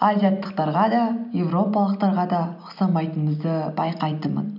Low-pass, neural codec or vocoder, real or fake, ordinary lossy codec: 5.4 kHz; none; real; none